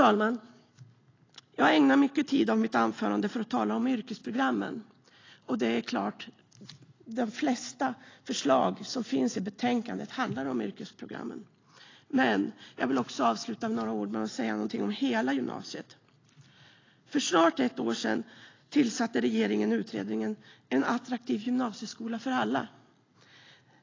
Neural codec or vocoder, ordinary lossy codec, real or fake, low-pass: none; AAC, 32 kbps; real; 7.2 kHz